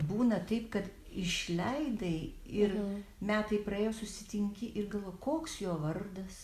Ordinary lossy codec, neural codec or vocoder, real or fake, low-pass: Opus, 64 kbps; none; real; 14.4 kHz